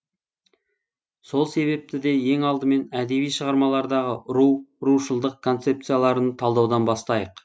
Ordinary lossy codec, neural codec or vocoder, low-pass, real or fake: none; none; none; real